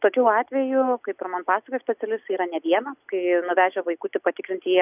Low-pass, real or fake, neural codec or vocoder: 3.6 kHz; real; none